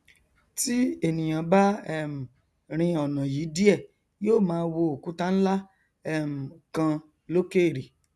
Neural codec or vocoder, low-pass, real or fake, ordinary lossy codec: none; none; real; none